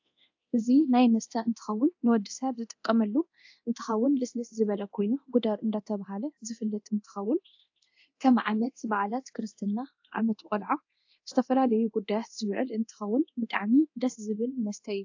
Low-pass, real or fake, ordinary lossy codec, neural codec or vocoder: 7.2 kHz; fake; AAC, 48 kbps; codec, 24 kHz, 0.9 kbps, DualCodec